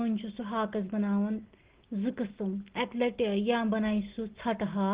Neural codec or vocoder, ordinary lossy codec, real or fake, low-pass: none; Opus, 16 kbps; real; 3.6 kHz